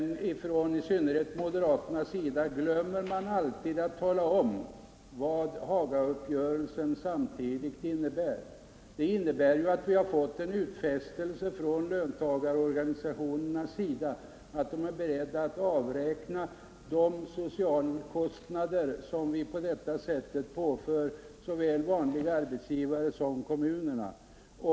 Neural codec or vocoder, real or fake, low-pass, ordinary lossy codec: none; real; none; none